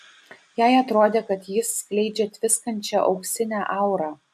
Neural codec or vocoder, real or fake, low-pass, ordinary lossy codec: none; real; 14.4 kHz; AAC, 96 kbps